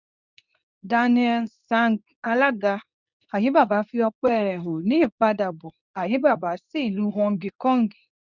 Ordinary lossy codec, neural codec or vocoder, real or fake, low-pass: none; codec, 24 kHz, 0.9 kbps, WavTokenizer, medium speech release version 2; fake; 7.2 kHz